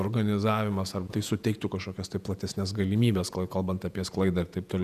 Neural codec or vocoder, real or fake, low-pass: vocoder, 48 kHz, 128 mel bands, Vocos; fake; 14.4 kHz